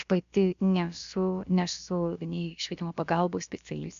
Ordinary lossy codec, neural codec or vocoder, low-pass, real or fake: MP3, 64 kbps; codec, 16 kHz, about 1 kbps, DyCAST, with the encoder's durations; 7.2 kHz; fake